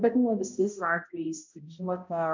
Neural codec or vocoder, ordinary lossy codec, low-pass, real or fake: codec, 16 kHz, 0.5 kbps, X-Codec, HuBERT features, trained on balanced general audio; MP3, 64 kbps; 7.2 kHz; fake